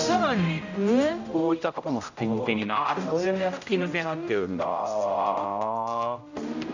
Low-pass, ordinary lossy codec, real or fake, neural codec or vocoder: 7.2 kHz; none; fake; codec, 16 kHz, 0.5 kbps, X-Codec, HuBERT features, trained on general audio